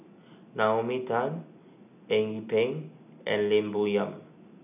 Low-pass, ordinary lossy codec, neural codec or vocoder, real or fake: 3.6 kHz; none; none; real